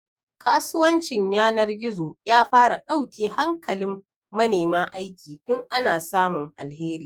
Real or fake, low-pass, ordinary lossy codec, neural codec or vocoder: fake; 19.8 kHz; none; codec, 44.1 kHz, 2.6 kbps, DAC